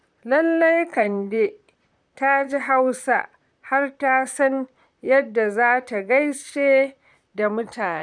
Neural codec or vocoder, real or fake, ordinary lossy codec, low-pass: vocoder, 44.1 kHz, 128 mel bands, Pupu-Vocoder; fake; none; 9.9 kHz